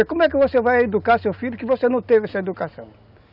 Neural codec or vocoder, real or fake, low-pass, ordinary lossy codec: none; real; 5.4 kHz; none